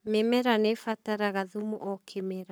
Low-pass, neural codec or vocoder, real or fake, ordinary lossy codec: none; codec, 44.1 kHz, 7.8 kbps, Pupu-Codec; fake; none